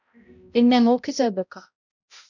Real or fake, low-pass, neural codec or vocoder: fake; 7.2 kHz; codec, 16 kHz, 0.5 kbps, X-Codec, HuBERT features, trained on balanced general audio